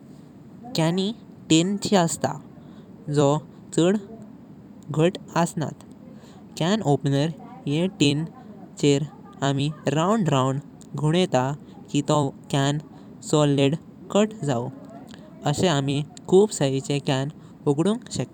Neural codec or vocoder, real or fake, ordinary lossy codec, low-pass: vocoder, 44.1 kHz, 128 mel bands every 256 samples, BigVGAN v2; fake; none; 19.8 kHz